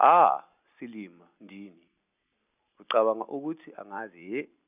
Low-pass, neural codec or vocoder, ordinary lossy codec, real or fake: 3.6 kHz; none; none; real